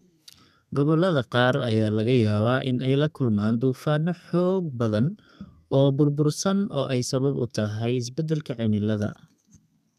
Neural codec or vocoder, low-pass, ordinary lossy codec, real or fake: codec, 32 kHz, 1.9 kbps, SNAC; 14.4 kHz; none; fake